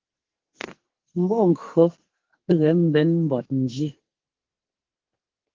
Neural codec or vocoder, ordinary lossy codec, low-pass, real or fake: codec, 44.1 kHz, 2.6 kbps, SNAC; Opus, 16 kbps; 7.2 kHz; fake